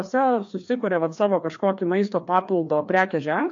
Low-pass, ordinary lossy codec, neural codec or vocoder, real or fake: 7.2 kHz; AAC, 48 kbps; codec, 16 kHz, 2 kbps, FreqCodec, larger model; fake